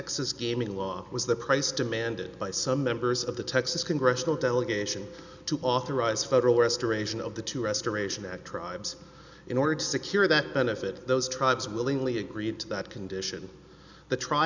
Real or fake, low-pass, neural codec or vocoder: real; 7.2 kHz; none